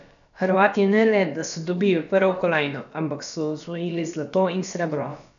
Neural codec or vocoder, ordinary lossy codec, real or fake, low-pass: codec, 16 kHz, about 1 kbps, DyCAST, with the encoder's durations; none; fake; 7.2 kHz